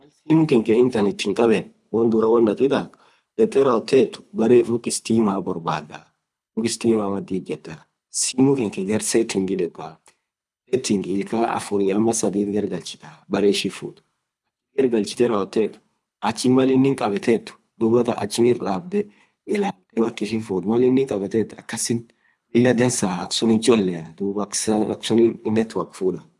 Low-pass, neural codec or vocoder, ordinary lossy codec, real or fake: none; codec, 24 kHz, 3 kbps, HILCodec; none; fake